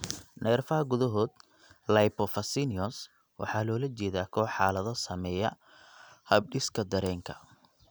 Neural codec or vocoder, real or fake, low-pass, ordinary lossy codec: none; real; none; none